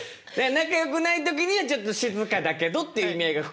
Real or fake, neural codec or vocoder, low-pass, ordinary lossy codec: real; none; none; none